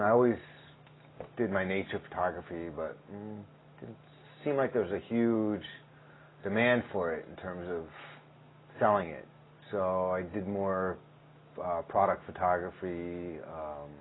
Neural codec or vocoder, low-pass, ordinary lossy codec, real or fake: none; 7.2 kHz; AAC, 16 kbps; real